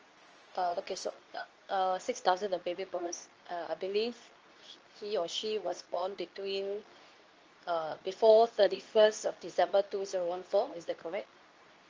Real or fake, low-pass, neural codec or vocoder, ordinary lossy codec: fake; 7.2 kHz; codec, 24 kHz, 0.9 kbps, WavTokenizer, medium speech release version 2; Opus, 24 kbps